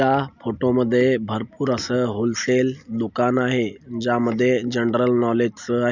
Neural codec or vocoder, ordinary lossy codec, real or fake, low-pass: none; none; real; 7.2 kHz